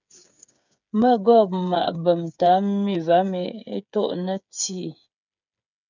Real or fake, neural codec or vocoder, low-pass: fake; codec, 16 kHz, 8 kbps, FreqCodec, smaller model; 7.2 kHz